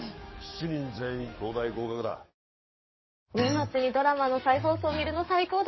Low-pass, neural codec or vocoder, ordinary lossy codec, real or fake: 7.2 kHz; codec, 44.1 kHz, 7.8 kbps, DAC; MP3, 24 kbps; fake